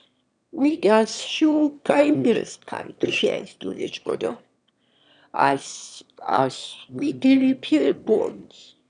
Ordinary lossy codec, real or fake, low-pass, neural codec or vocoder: none; fake; 9.9 kHz; autoencoder, 22.05 kHz, a latent of 192 numbers a frame, VITS, trained on one speaker